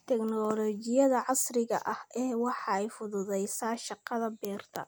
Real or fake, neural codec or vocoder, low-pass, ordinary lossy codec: real; none; none; none